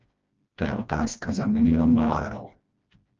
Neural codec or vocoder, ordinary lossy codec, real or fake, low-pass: codec, 16 kHz, 1 kbps, FreqCodec, smaller model; Opus, 24 kbps; fake; 7.2 kHz